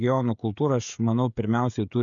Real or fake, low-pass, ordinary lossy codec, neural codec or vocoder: fake; 7.2 kHz; MP3, 96 kbps; codec, 16 kHz, 16 kbps, FreqCodec, smaller model